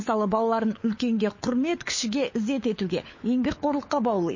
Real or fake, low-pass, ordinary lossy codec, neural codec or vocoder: fake; 7.2 kHz; MP3, 32 kbps; codec, 16 kHz, 4 kbps, FunCodec, trained on LibriTTS, 50 frames a second